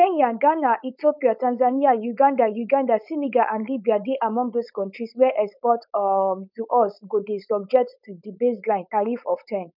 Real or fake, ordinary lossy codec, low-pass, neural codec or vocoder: fake; none; 5.4 kHz; codec, 16 kHz, 4.8 kbps, FACodec